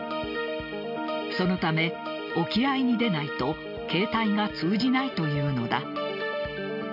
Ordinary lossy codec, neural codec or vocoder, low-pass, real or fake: none; none; 5.4 kHz; real